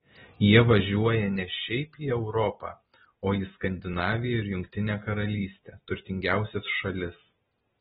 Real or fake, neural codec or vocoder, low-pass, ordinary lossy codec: real; none; 19.8 kHz; AAC, 16 kbps